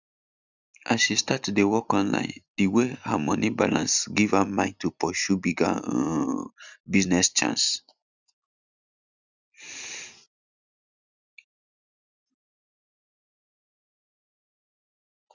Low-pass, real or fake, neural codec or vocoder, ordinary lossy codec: 7.2 kHz; real; none; none